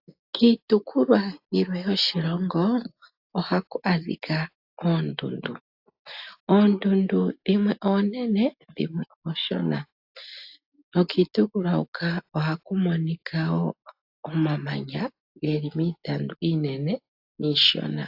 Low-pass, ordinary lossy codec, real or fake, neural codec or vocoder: 5.4 kHz; AAC, 48 kbps; real; none